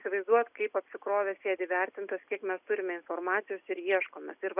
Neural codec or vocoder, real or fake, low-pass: none; real; 3.6 kHz